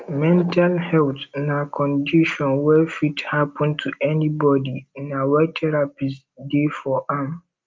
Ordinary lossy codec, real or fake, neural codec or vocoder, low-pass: Opus, 24 kbps; real; none; 7.2 kHz